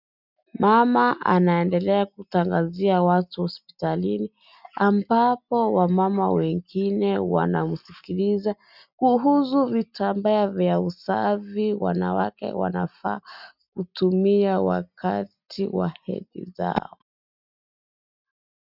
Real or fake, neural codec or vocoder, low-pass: real; none; 5.4 kHz